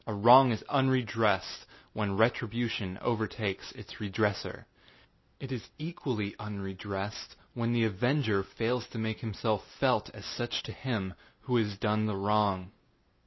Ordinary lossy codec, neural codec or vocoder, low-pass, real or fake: MP3, 24 kbps; none; 7.2 kHz; real